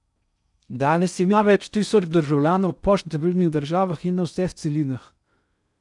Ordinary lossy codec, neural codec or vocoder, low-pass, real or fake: none; codec, 16 kHz in and 24 kHz out, 0.6 kbps, FocalCodec, streaming, 4096 codes; 10.8 kHz; fake